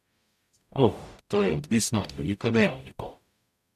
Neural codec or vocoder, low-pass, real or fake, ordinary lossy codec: codec, 44.1 kHz, 0.9 kbps, DAC; 14.4 kHz; fake; none